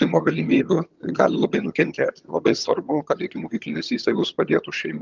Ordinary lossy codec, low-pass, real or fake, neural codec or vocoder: Opus, 24 kbps; 7.2 kHz; fake; vocoder, 22.05 kHz, 80 mel bands, HiFi-GAN